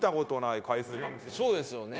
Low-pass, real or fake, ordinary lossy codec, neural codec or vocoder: none; fake; none; codec, 16 kHz, 0.9 kbps, LongCat-Audio-Codec